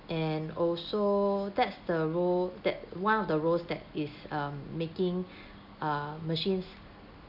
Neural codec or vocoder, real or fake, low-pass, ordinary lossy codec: none; real; 5.4 kHz; none